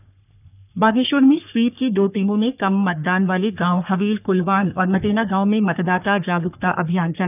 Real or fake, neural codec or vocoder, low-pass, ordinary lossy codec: fake; codec, 44.1 kHz, 3.4 kbps, Pupu-Codec; 3.6 kHz; none